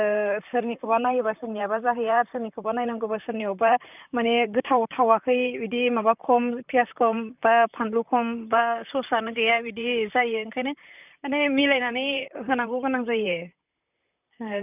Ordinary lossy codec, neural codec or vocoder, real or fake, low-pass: none; vocoder, 44.1 kHz, 128 mel bands, Pupu-Vocoder; fake; 3.6 kHz